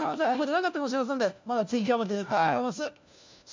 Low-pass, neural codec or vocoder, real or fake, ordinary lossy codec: 7.2 kHz; codec, 16 kHz, 1 kbps, FunCodec, trained on LibriTTS, 50 frames a second; fake; MP3, 64 kbps